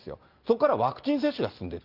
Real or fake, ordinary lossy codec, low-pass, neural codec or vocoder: real; Opus, 24 kbps; 5.4 kHz; none